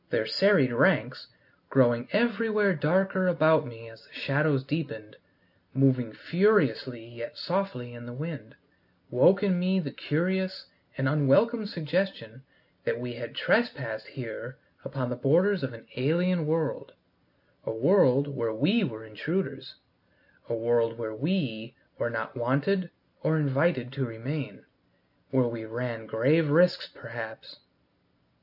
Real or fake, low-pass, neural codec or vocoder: real; 5.4 kHz; none